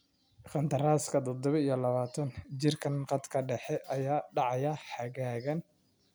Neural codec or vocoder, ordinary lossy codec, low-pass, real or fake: none; none; none; real